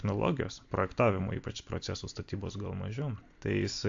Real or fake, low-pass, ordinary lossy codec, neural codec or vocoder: real; 7.2 kHz; AAC, 64 kbps; none